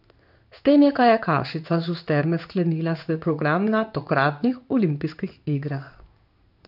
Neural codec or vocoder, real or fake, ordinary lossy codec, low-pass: codec, 16 kHz in and 24 kHz out, 1 kbps, XY-Tokenizer; fake; none; 5.4 kHz